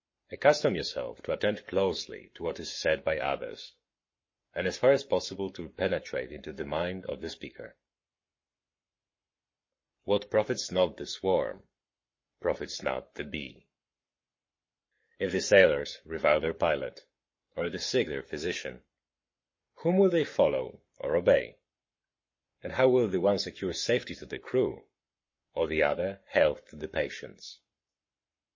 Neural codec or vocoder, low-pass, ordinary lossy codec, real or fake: codec, 44.1 kHz, 7.8 kbps, Pupu-Codec; 7.2 kHz; MP3, 32 kbps; fake